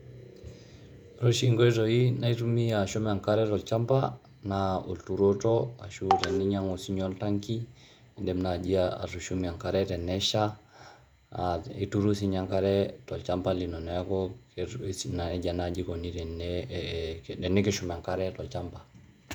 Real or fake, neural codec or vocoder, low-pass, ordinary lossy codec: fake; vocoder, 48 kHz, 128 mel bands, Vocos; 19.8 kHz; none